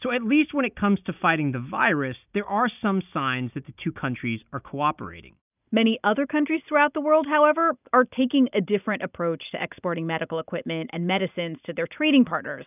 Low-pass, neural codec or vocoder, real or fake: 3.6 kHz; none; real